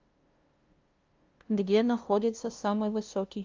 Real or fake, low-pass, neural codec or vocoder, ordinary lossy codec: fake; 7.2 kHz; codec, 16 kHz, 0.5 kbps, FunCodec, trained on LibriTTS, 25 frames a second; Opus, 24 kbps